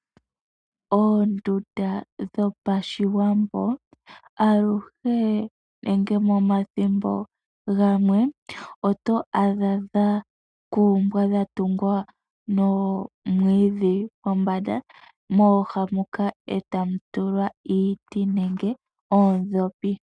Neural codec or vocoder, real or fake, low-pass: none; real; 9.9 kHz